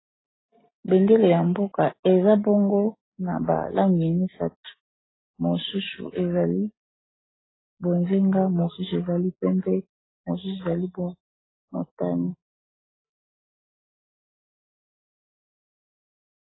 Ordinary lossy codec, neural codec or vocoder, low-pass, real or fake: AAC, 16 kbps; none; 7.2 kHz; real